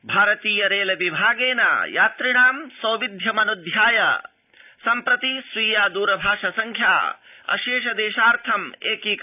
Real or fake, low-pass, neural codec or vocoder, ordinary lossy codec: real; 3.6 kHz; none; none